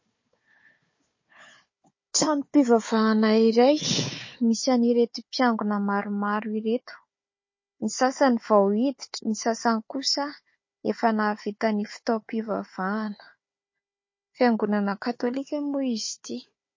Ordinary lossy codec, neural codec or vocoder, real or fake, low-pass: MP3, 32 kbps; codec, 16 kHz, 4 kbps, FunCodec, trained on Chinese and English, 50 frames a second; fake; 7.2 kHz